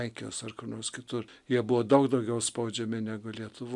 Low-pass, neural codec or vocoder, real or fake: 10.8 kHz; none; real